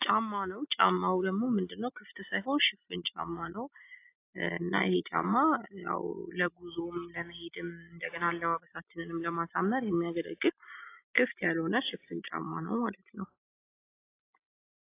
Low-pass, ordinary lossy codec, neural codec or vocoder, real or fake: 3.6 kHz; AAC, 24 kbps; none; real